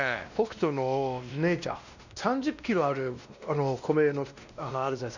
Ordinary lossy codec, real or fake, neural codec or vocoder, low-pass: none; fake; codec, 16 kHz, 1 kbps, X-Codec, WavLM features, trained on Multilingual LibriSpeech; 7.2 kHz